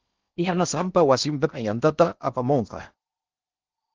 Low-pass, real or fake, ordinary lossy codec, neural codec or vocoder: 7.2 kHz; fake; Opus, 24 kbps; codec, 16 kHz in and 24 kHz out, 0.6 kbps, FocalCodec, streaming, 4096 codes